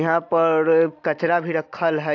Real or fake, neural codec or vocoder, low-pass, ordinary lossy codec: real; none; 7.2 kHz; AAC, 48 kbps